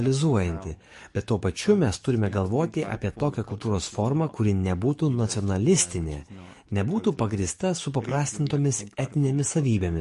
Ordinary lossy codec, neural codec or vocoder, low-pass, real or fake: MP3, 48 kbps; codec, 44.1 kHz, 7.8 kbps, DAC; 14.4 kHz; fake